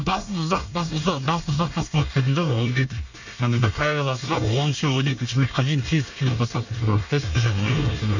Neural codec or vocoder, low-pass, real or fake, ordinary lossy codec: codec, 24 kHz, 1 kbps, SNAC; 7.2 kHz; fake; none